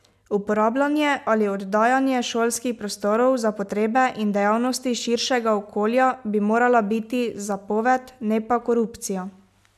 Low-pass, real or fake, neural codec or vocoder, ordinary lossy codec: 14.4 kHz; real; none; none